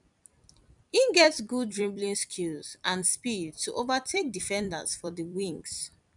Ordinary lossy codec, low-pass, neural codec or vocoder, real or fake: none; 10.8 kHz; vocoder, 44.1 kHz, 128 mel bands every 256 samples, BigVGAN v2; fake